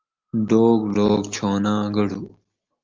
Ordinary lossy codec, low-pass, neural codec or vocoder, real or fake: Opus, 32 kbps; 7.2 kHz; none; real